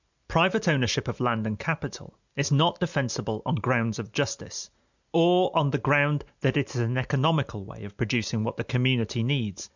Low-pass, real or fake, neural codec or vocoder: 7.2 kHz; real; none